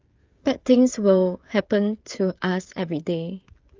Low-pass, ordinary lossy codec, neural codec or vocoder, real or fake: 7.2 kHz; Opus, 32 kbps; codec, 16 kHz, 16 kbps, FreqCodec, smaller model; fake